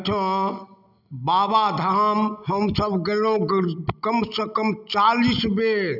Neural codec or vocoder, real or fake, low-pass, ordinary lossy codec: none; real; 5.4 kHz; none